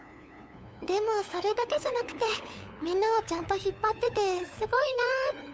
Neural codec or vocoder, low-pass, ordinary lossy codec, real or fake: codec, 16 kHz, 8 kbps, FunCodec, trained on LibriTTS, 25 frames a second; none; none; fake